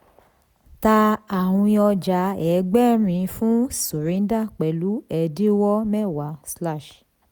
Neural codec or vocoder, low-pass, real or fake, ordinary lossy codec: none; none; real; none